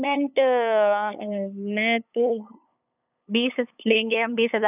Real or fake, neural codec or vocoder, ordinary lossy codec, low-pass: fake; codec, 16 kHz, 8 kbps, FunCodec, trained on LibriTTS, 25 frames a second; none; 3.6 kHz